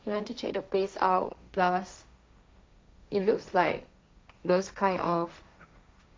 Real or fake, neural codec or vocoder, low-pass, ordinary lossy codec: fake; codec, 16 kHz, 1.1 kbps, Voila-Tokenizer; none; none